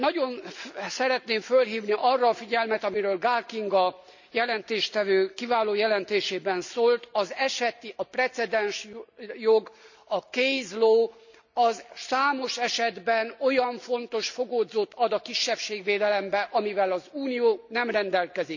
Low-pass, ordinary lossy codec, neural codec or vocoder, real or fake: 7.2 kHz; none; none; real